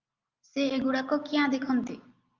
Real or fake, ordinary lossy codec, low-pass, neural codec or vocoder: real; Opus, 32 kbps; 7.2 kHz; none